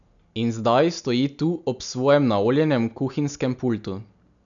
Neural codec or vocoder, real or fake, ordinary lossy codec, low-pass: none; real; none; 7.2 kHz